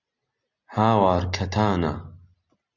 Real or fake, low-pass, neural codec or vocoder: real; 7.2 kHz; none